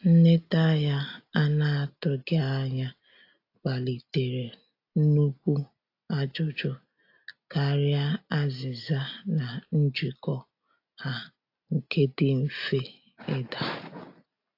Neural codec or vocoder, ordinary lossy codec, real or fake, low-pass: none; AAC, 32 kbps; real; 5.4 kHz